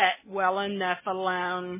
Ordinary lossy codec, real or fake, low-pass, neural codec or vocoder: MP3, 16 kbps; real; 3.6 kHz; none